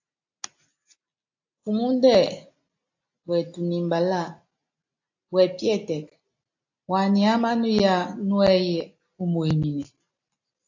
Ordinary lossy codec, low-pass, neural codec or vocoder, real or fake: AAC, 48 kbps; 7.2 kHz; none; real